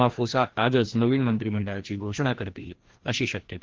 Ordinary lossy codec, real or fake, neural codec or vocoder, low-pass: Opus, 16 kbps; fake; codec, 16 kHz, 1 kbps, FreqCodec, larger model; 7.2 kHz